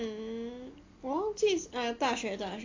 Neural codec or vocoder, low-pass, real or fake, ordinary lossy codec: none; 7.2 kHz; real; AAC, 32 kbps